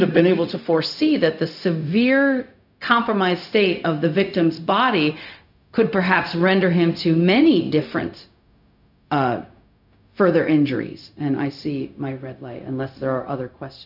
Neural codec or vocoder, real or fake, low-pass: codec, 16 kHz, 0.4 kbps, LongCat-Audio-Codec; fake; 5.4 kHz